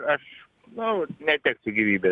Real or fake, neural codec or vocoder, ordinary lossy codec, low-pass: real; none; MP3, 96 kbps; 10.8 kHz